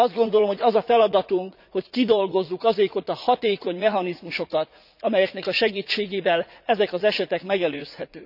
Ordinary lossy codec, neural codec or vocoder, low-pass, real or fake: none; vocoder, 44.1 kHz, 80 mel bands, Vocos; 5.4 kHz; fake